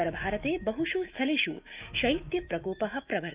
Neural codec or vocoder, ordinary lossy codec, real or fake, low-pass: autoencoder, 48 kHz, 128 numbers a frame, DAC-VAE, trained on Japanese speech; Opus, 24 kbps; fake; 3.6 kHz